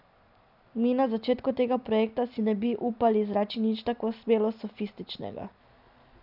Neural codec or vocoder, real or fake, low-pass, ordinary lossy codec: none; real; 5.4 kHz; none